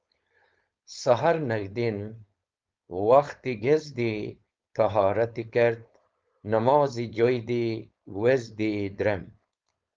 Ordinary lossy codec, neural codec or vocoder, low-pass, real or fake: Opus, 24 kbps; codec, 16 kHz, 4.8 kbps, FACodec; 7.2 kHz; fake